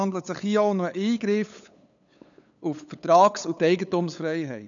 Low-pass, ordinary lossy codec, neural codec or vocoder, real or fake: 7.2 kHz; none; codec, 16 kHz, 8 kbps, FunCodec, trained on LibriTTS, 25 frames a second; fake